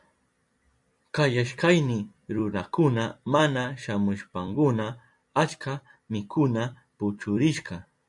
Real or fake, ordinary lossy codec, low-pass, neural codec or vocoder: fake; MP3, 96 kbps; 10.8 kHz; vocoder, 44.1 kHz, 128 mel bands every 256 samples, BigVGAN v2